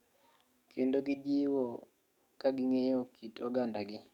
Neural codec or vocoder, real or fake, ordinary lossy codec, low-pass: codec, 44.1 kHz, 7.8 kbps, DAC; fake; none; 19.8 kHz